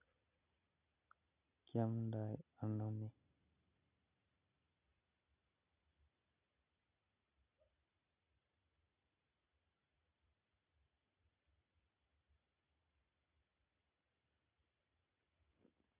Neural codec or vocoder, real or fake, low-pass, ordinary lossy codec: none; real; 3.6 kHz; none